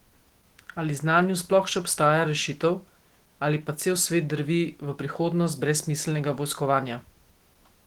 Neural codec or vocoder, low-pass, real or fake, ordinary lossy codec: autoencoder, 48 kHz, 128 numbers a frame, DAC-VAE, trained on Japanese speech; 19.8 kHz; fake; Opus, 16 kbps